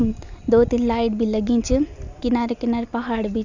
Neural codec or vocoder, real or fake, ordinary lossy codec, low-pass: none; real; none; 7.2 kHz